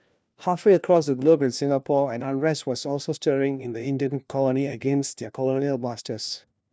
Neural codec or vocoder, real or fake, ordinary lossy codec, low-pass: codec, 16 kHz, 1 kbps, FunCodec, trained on LibriTTS, 50 frames a second; fake; none; none